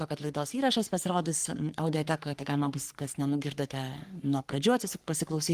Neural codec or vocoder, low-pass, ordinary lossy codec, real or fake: codec, 44.1 kHz, 3.4 kbps, Pupu-Codec; 14.4 kHz; Opus, 24 kbps; fake